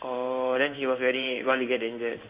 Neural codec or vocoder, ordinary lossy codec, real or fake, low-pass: codec, 16 kHz in and 24 kHz out, 1 kbps, XY-Tokenizer; Opus, 64 kbps; fake; 3.6 kHz